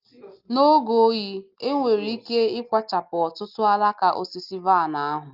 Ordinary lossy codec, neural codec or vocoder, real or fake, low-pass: Opus, 24 kbps; none; real; 5.4 kHz